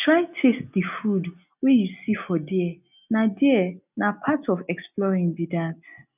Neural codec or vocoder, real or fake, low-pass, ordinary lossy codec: none; real; 3.6 kHz; none